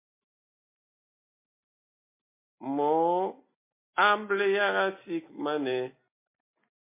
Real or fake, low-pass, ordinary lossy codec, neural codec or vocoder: real; 3.6 kHz; MP3, 24 kbps; none